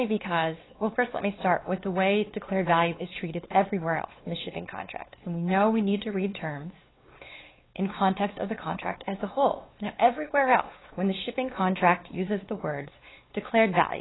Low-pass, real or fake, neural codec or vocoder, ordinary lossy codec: 7.2 kHz; fake; codec, 16 kHz, 4 kbps, X-Codec, WavLM features, trained on Multilingual LibriSpeech; AAC, 16 kbps